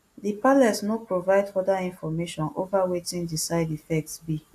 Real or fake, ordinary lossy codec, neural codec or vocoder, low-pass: real; AAC, 64 kbps; none; 14.4 kHz